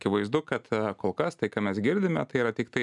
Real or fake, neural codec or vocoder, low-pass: real; none; 10.8 kHz